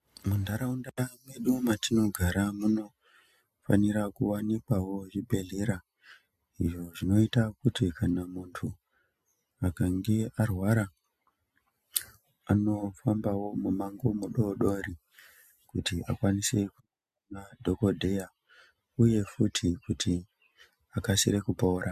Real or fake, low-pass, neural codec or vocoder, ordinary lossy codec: real; 14.4 kHz; none; MP3, 96 kbps